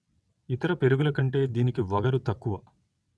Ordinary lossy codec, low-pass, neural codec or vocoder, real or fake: none; none; vocoder, 22.05 kHz, 80 mel bands, WaveNeXt; fake